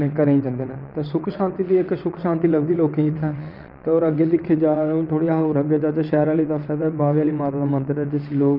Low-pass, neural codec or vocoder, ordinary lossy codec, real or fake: 5.4 kHz; vocoder, 22.05 kHz, 80 mel bands, WaveNeXt; none; fake